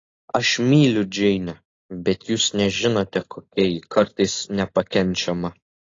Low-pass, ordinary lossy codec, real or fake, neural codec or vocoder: 7.2 kHz; AAC, 32 kbps; real; none